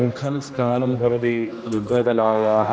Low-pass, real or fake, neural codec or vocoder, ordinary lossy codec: none; fake; codec, 16 kHz, 1 kbps, X-Codec, HuBERT features, trained on general audio; none